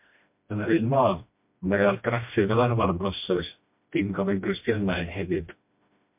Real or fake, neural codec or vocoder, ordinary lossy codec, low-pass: fake; codec, 16 kHz, 1 kbps, FreqCodec, smaller model; MP3, 32 kbps; 3.6 kHz